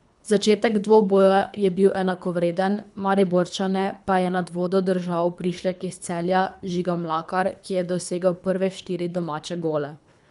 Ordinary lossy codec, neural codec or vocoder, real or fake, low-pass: none; codec, 24 kHz, 3 kbps, HILCodec; fake; 10.8 kHz